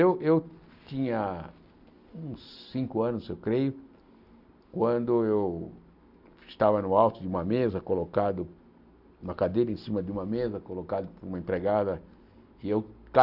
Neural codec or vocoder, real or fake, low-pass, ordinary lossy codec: none; real; 5.4 kHz; none